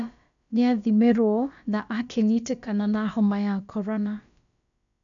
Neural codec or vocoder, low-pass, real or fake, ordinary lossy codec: codec, 16 kHz, about 1 kbps, DyCAST, with the encoder's durations; 7.2 kHz; fake; none